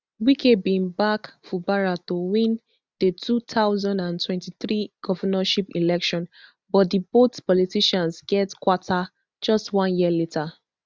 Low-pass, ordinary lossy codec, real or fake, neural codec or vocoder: none; none; real; none